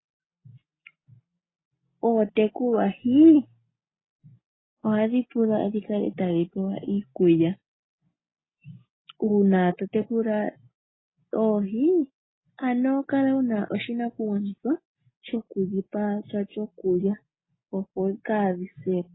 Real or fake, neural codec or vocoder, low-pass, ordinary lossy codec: real; none; 7.2 kHz; AAC, 16 kbps